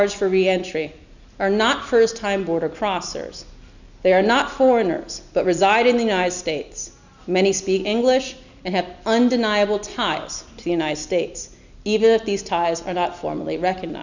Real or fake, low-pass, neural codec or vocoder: real; 7.2 kHz; none